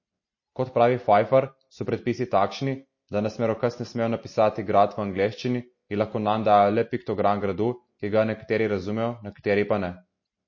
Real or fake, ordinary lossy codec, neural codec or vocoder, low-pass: real; MP3, 32 kbps; none; 7.2 kHz